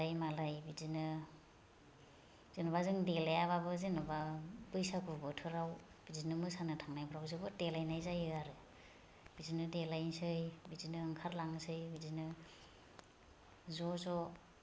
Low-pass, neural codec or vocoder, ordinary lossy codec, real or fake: none; none; none; real